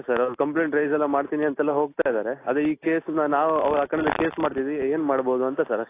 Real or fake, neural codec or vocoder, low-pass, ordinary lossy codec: real; none; 3.6 kHz; AAC, 24 kbps